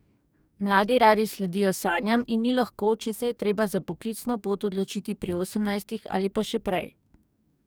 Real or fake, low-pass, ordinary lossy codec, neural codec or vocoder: fake; none; none; codec, 44.1 kHz, 2.6 kbps, DAC